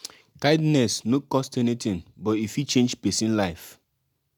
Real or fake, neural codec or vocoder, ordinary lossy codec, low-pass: real; none; none; 19.8 kHz